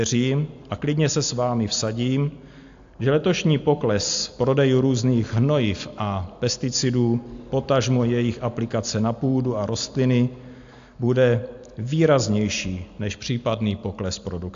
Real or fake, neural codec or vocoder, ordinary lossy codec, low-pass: real; none; AAC, 64 kbps; 7.2 kHz